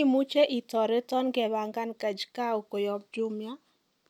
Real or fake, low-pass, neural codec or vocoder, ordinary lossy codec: real; 19.8 kHz; none; none